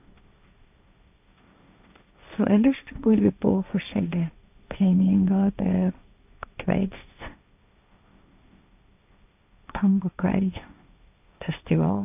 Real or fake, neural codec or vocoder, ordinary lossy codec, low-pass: fake; codec, 16 kHz, 1.1 kbps, Voila-Tokenizer; AAC, 32 kbps; 3.6 kHz